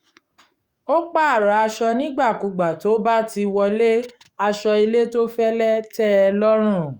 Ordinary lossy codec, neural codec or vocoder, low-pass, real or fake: Opus, 64 kbps; codec, 44.1 kHz, 7.8 kbps, DAC; 19.8 kHz; fake